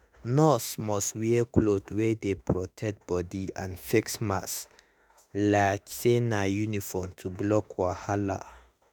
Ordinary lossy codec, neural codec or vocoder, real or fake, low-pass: none; autoencoder, 48 kHz, 32 numbers a frame, DAC-VAE, trained on Japanese speech; fake; none